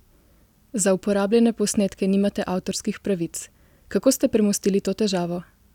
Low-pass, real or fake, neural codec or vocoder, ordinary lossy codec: 19.8 kHz; real; none; none